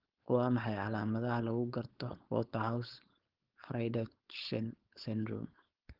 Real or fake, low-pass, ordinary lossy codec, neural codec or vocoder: fake; 5.4 kHz; Opus, 16 kbps; codec, 16 kHz, 4.8 kbps, FACodec